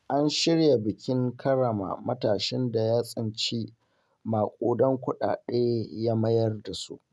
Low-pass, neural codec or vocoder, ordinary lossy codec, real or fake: none; none; none; real